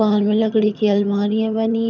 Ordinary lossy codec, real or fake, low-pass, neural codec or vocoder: none; fake; 7.2 kHz; vocoder, 22.05 kHz, 80 mel bands, HiFi-GAN